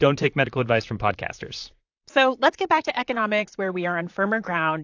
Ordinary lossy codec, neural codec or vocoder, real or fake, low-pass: AAC, 48 kbps; vocoder, 44.1 kHz, 128 mel bands, Pupu-Vocoder; fake; 7.2 kHz